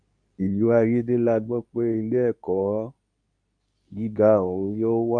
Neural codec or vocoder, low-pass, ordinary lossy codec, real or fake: codec, 24 kHz, 0.9 kbps, WavTokenizer, medium speech release version 2; 9.9 kHz; none; fake